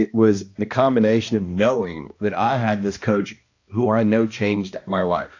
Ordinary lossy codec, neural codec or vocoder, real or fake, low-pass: AAC, 48 kbps; codec, 16 kHz, 1 kbps, X-Codec, HuBERT features, trained on balanced general audio; fake; 7.2 kHz